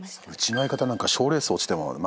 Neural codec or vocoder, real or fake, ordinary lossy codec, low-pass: none; real; none; none